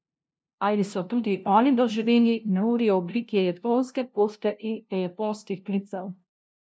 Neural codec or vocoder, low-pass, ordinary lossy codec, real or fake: codec, 16 kHz, 0.5 kbps, FunCodec, trained on LibriTTS, 25 frames a second; none; none; fake